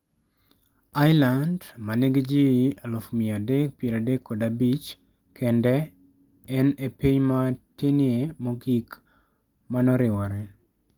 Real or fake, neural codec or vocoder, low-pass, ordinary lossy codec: real; none; 19.8 kHz; Opus, 24 kbps